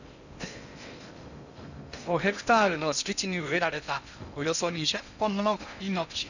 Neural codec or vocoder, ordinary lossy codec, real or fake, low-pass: codec, 16 kHz in and 24 kHz out, 0.6 kbps, FocalCodec, streaming, 2048 codes; none; fake; 7.2 kHz